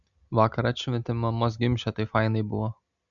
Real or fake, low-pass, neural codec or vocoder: real; 7.2 kHz; none